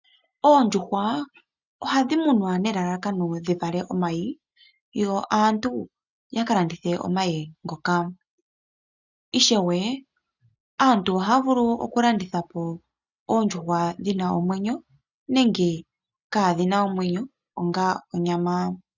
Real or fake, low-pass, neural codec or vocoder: real; 7.2 kHz; none